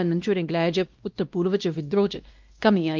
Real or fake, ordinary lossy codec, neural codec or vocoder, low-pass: fake; Opus, 32 kbps; codec, 16 kHz, 1 kbps, X-Codec, WavLM features, trained on Multilingual LibriSpeech; 7.2 kHz